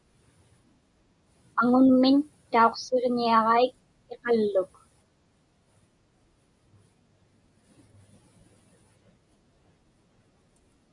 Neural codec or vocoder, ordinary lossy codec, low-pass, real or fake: none; MP3, 96 kbps; 10.8 kHz; real